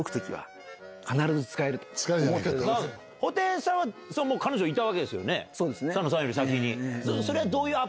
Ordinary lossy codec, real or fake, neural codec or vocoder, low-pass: none; real; none; none